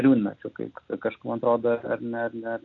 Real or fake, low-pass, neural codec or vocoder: real; 7.2 kHz; none